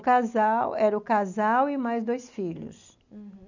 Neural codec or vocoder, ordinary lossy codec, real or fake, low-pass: none; none; real; 7.2 kHz